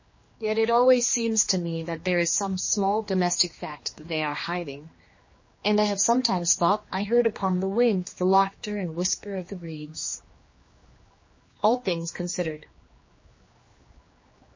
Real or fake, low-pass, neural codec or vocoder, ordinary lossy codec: fake; 7.2 kHz; codec, 16 kHz, 2 kbps, X-Codec, HuBERT features, trained on general audio; MP3, 32 kbps